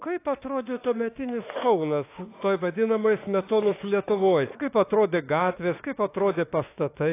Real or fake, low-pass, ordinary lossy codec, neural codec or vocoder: fake; 3.6 kHz; AAC, 24 kbps; autoencoder, 48 kHz, 32 numbers a frame, DAC-VAE, trained on Japanese speech